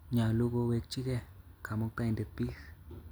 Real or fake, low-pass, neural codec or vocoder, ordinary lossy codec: real; none; none; none